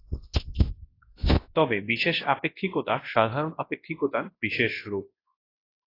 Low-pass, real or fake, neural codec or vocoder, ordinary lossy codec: 5.4 kHz; fake; codec, 24 kHz, 0.9 kbps, WavTokenizer, large speech release; AAC, 24 kbps